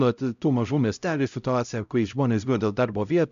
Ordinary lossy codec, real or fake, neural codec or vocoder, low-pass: AAC, 96 kbps; fake; codec, 16 kHz, 0.5 kbps, X-Codec, HuBERT features, trained on LibriSpeech; 7.2 kHz